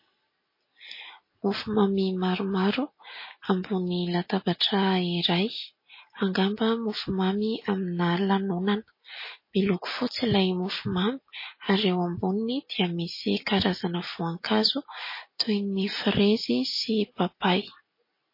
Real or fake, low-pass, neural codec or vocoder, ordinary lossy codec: real; 5.4 kHz; none; MP3, 24 kbps